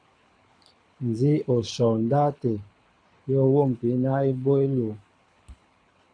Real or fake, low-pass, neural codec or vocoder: fake; 9.9 kHz; codec, 24 kHz, 6 kbps, HILCodec